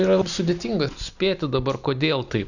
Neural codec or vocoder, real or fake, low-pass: none; real; 7.2 kHz